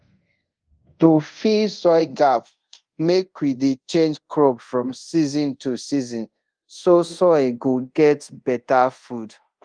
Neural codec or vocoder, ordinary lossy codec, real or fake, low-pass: codec, 24 kHz, 0.5 kbps, DualCodec; Opus, 24 kbps; fake; 9.9 kHz